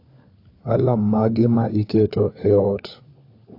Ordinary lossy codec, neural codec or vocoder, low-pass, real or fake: AAC, 32 kbps; codec, 16 kHz, 4 kbps, FunCodec, trained on LibriTTS, 50 frames a second; 5.4 kHz; fake